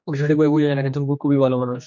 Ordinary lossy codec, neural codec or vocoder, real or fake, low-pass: MP3, 48 kbps; codec, 16 kHz, 2 kbps, X-Codec, HuBERT features, trained on general audio; fake; 7.2 kHz